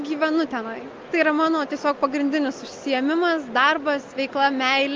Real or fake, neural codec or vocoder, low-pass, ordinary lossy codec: real; none; 7.2 kHz; Opus, 32 kbps